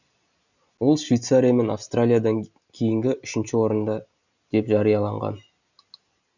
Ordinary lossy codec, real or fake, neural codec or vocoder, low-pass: none; real; none; 7.2 kHz